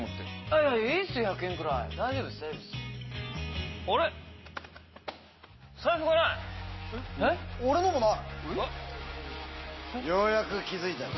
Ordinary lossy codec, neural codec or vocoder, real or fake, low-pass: MP3, 24 kbps; none; real; 7.2 kHz